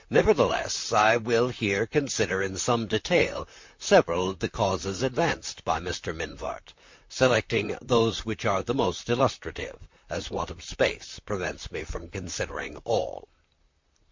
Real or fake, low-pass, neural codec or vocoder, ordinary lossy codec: fake; 7.2 kHz; vocoder, 44.1 kHz, 128 mel bands, Pupu-Vocoder; MP3, 48 kbps